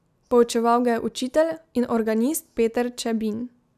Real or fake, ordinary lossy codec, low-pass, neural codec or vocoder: real; none; 14.4 kHz; none